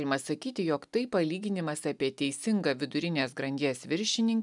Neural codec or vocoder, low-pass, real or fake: none; 10.8 kHz; real